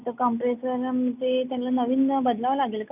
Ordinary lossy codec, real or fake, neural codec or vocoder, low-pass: none; real; none; 3.6 kHz